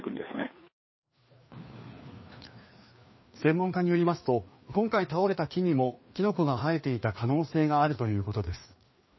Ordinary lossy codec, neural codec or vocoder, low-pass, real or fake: MP3, 24 kbps; codec, 16 kHz, 2 kbps, FreqCodec, larger model; 7.2 kHz; fake